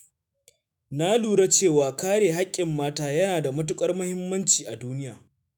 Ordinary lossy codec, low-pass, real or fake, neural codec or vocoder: none; none; fake; autoencoder, 48 kHz, 128 numbers a frame, DAC-VAE, trained on Japanese speech